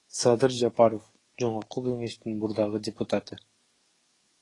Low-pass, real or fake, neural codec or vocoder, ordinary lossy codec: 10.8 kHz; fake; codec, 44.1 kHz, 7.8 kbps, DAC; AAC, 32 kbps